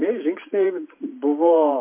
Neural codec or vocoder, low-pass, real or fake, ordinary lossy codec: vocoder, 44.1 kHz, 128 mel bands every 512 samples, BigVGAN v2; 3.6 kHz; fake; MP3, 24 kbps